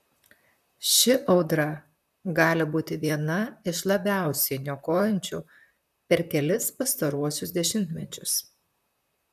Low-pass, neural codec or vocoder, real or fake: 14.4 kHz; vocoder, 44.1 kHz, 128 mel bands, Pupu-Vocoder; fake